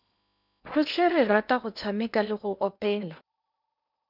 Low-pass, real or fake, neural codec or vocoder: 5.4 kHz; fake; codec, 16 kHz in and 24 kHz out, 0.8 kbps, FocalCodec, streaming, 65536 codes